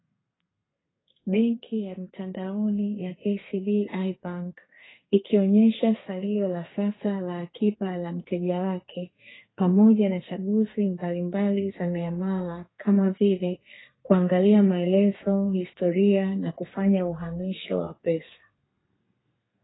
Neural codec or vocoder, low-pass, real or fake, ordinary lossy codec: codec, 32 kHz, 1.9 kbps, SNAC; 7.2 kHz; fake; AAC, 16 kbps